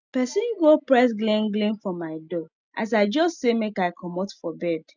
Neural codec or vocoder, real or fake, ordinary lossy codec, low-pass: none; real; none; 7.2 kHz